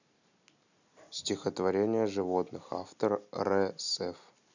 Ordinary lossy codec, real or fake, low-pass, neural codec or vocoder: none; real; 7.2 kHz; none